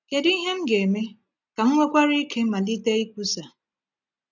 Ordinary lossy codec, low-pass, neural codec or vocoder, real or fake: none; 7.2 kHz; none; real